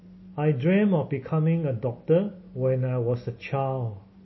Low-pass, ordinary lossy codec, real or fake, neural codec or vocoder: 7.2 kHz; MP3, 24 kbps; real; none